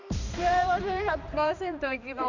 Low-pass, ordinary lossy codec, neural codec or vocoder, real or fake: 7.2 kHz; none; codec, 16 kHz, 2 kbps, X-Codec, HuBERT features, trained on balanced general audio; fake